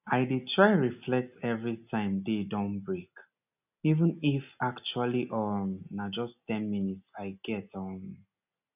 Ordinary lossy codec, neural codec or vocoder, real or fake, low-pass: none; none; real; 3.6 kHz